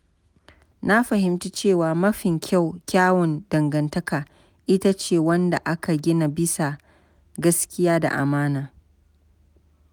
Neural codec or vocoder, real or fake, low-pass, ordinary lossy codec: none; real; none; none